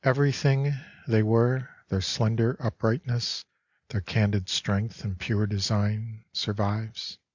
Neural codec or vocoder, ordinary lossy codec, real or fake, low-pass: none; Opus, 64 kbps; real; 7.2 kHz